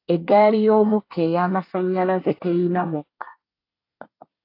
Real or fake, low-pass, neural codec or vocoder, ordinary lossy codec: fake; 5.4 kHz; codec, 24 kHz, 1 kbps, SNAC; AAC, 32 kbps